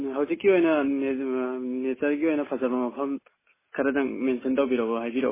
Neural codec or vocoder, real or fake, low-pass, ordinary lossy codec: none; real; 3.6 kHz; MP3, 16 kbps